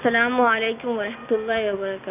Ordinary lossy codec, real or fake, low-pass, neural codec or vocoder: none; real; 3.6 kHz; none